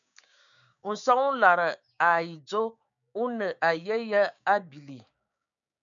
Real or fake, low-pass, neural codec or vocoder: fake; 7.2 kHz; codec, 16 kHz, 6 kbps, DAC